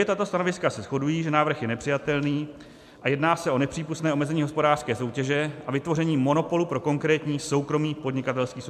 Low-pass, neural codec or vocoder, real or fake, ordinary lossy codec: 14.4 kHz; none; real; MP3, 96 kbps